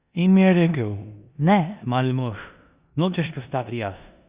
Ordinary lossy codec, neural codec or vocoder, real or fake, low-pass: Opus, 64 kbps; codec, 16 kHz in and 24 kHz out, 0.9 kbps, LongCat-Audio-Codec, four codebook decoder; fake; 3.6 kHz